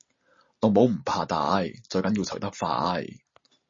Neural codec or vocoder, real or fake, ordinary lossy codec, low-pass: none; real; MP3, 32 kbps; 7.2 kHz